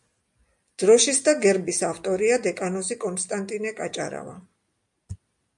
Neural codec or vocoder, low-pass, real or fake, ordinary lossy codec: none; 10.8 kHz; real; MP3, 64 kbps